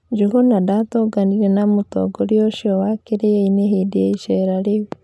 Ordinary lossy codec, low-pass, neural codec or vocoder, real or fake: none; none; none; real